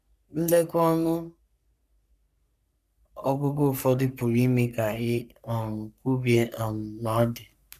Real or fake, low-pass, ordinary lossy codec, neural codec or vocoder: fake; 14.4 kHz; none; codec, 44.1 kHz, 3.4 kbps, Pupu-Codec